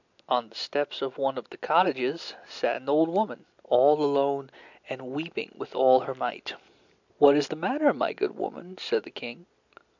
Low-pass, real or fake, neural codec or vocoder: 7.2 kHz; real; none